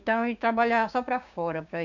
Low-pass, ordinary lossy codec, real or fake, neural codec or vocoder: 7.2 kHz; none; fake; codec, 16 kHz, 6 kbps, DAC